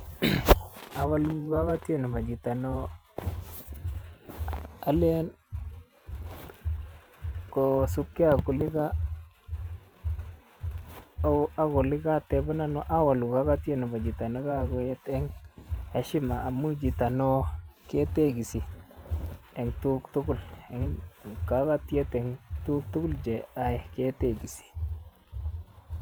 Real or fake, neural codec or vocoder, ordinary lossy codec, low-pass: fake; vocoder, 44.1 kHz, 128 mel bands every 512 samples, BigVGAN v2; none; none